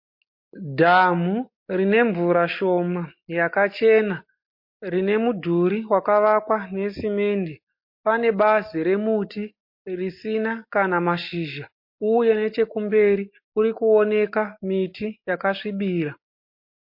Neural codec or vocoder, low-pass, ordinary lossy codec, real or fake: none; 5.4 kHz; MP3, 32 kbps; real